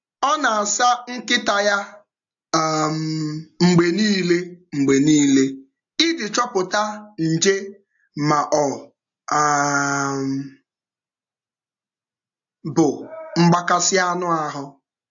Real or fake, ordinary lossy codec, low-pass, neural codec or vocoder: real; AAC, 64 kbps; 7.2 kHz; none